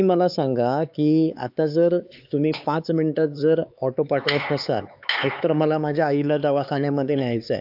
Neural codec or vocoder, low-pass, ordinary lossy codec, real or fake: codec, 16 kHz, 4 kbps, X-Codec, HuBERT features, trained on LibriSpeech; 5.4 kHz; AAC, 48 kbps; fake